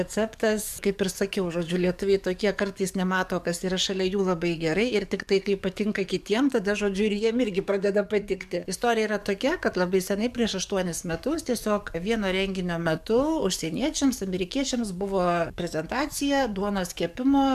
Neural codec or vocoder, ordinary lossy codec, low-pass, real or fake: codec, 44.1 kHz, 7.8 kbps, DAC; MP3, 96 kbps; 14.4 kHz; fake